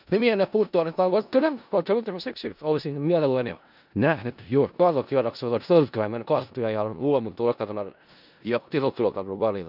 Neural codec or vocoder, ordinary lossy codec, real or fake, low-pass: codec, 16 kHz in and 24 kHz out, 0.4 kbps, LongCat-Audio-Codec, four codebook decoder; none; fake; 5.4 kHz